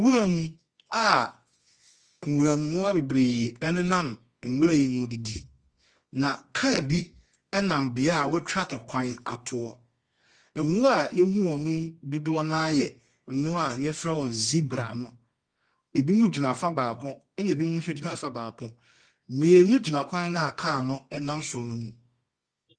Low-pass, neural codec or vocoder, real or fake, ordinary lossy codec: 9.9 kHz; codec, 24 kHz, 0.9 kbps, WavTokenizer, medium music audio release; fake; Opus, 32 kbps